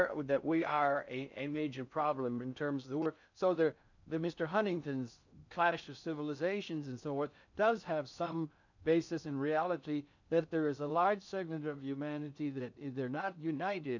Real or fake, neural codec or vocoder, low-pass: fake; codec, 16 kHz in and 24 kHz out, 0.6 kbps, FocalCodec, streaming, 4096 codes; 7.2 kHz